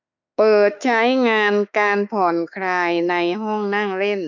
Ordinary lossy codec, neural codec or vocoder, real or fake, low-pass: none; autoencoder, 48 kHz, 32 numbers a frame, DAC-VAE, trained on Japanese speech; fake; 7.2 kHz